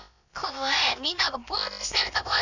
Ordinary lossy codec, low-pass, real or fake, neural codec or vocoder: none; 7.2 kHz; fake; codec, 16 kHz, about 1 kbps, DyCAST, with the encoder's durations